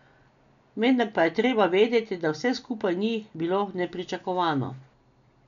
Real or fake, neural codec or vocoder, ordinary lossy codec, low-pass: real; none; none; 7.2 kHz